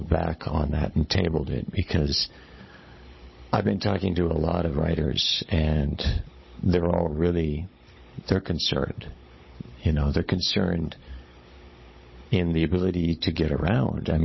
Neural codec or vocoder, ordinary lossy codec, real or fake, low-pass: codec, 16 kHz, 16 kbps, FunCodec, trained on Chinese and English, 50 frames a second; MP3, 24 kbps; fake; 7.2 kHz